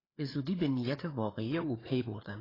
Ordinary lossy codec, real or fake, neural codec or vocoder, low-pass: AAC, 24 kbps; fake; codec, 16 kHz, 4 kbps, FreqCodec, larger model; 5.4 kHz